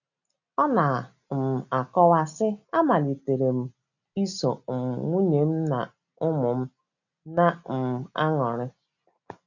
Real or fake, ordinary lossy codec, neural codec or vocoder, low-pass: real; none; none; 7.2 kHz